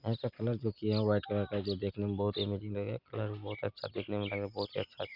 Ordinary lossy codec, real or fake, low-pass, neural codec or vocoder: none; real; 5.4 kHz; none